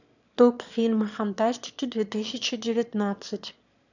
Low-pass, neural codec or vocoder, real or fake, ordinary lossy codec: 7.2 kHz; autoencoder, 22.05 kHz, a latent of 192 numbers a frame, VITS, trained on one speaker; fake; none